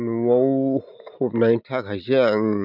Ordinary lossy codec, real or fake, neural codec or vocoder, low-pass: none; real; none; 5.4 kHz